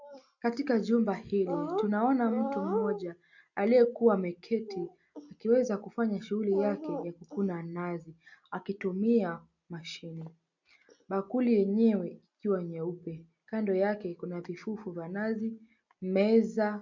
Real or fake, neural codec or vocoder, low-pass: real; none; 7.2 kHz